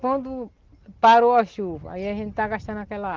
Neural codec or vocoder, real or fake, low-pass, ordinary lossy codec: none; real; 7.2 kHz; Opus, 16 kbps